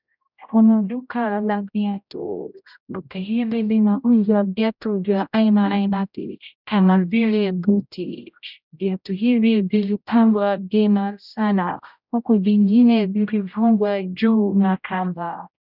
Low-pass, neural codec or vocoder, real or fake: 5.4 kHz; codec, 16 kHz, 0.5 kbps, X-Codec, HuBERT features, trained on general audio; fake